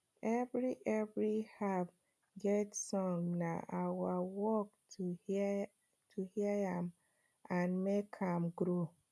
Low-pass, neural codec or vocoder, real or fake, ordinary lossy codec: none; none; real; none